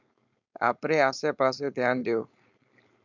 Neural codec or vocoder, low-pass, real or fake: codec, 16 kHz, 4.8 kbps, FACodec; 7.2 kHz; fake